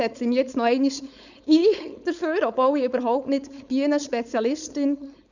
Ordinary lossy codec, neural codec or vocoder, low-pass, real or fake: none; codec, 16 kHz, 4.8 kbps, FACodec; 7.2 kHz; fake